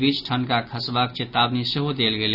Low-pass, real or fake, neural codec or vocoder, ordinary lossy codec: 5.4 kHz; real; none; none